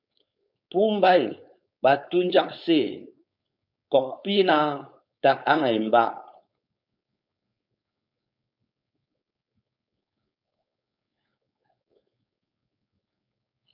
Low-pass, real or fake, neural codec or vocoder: 5.4 kHz; fake; codec, 16 kHz, 4.8 kbps, FACodec